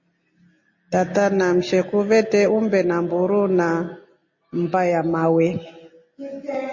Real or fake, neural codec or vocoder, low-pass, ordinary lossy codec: real; none; 7.2 kHz; MP3, 32 kbps